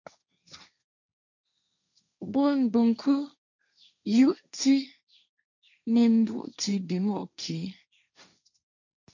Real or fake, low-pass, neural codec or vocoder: fake; 7.2 kHz; codec, 16 kHz, 1.1 kbps, Voila-Tokenizer